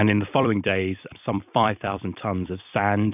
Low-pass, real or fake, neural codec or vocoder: 3.6 kHz; fake; vocoder, 44.1 kHz, 128 mel bands every 256 samples, BigVGAN v2